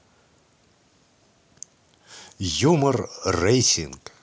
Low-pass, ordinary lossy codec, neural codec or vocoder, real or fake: none; none; none; real